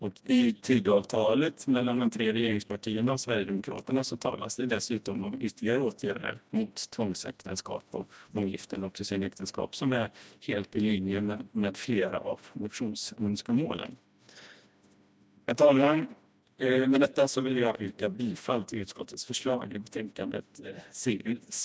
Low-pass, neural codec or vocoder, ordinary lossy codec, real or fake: none; codec, 16 kHz, 1 kbps, FreqCodec, smaller model; none; fake